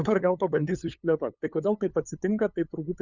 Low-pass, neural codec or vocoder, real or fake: 7.2 kHz; codec, 16 kHz, 8 kbps, FunCodec, trained on LibriTTS, 25 frames a second; fake